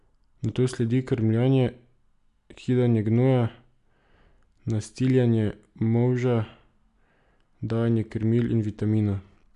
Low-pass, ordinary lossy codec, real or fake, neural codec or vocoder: 10.8 kHz; none; real; none